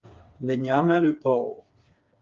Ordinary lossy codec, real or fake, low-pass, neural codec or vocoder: Opus, 32 kbps; fake; 7.2 kHz; codec, 16 kHz, 4 kbps, FreqCodec, smaller model